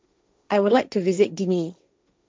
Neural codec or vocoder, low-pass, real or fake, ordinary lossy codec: codec, 16 kHz, 1.1 kbps, Voila-Tokenizer; none; fake; none